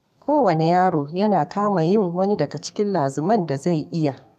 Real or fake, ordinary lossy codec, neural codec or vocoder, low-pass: fake; none; codec, 32 kHz, 1.9 kbps, SNAC; 14.4 kHz